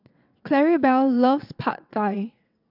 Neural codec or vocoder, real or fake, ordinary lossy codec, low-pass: vocoder, 22.05 kHz, 80 mel bands, WaveNeXt; fake; AAC, 48 kbps; 5.4 kHz